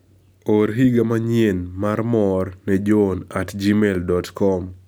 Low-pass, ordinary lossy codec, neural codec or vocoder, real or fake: none; none; none; real